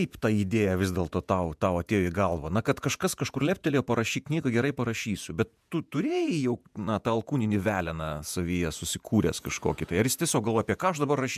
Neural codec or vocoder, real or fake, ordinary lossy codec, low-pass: vocoder, 48 kHz, 128 mel bands, Vocos; fake; MP3, 96 kbps; 14.4 kHz